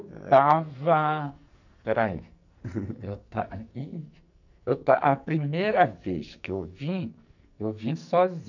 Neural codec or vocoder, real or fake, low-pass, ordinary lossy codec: codec, 44.1 kHz, 2.6 kbps, SNAC; fake; 7.2 kHz; none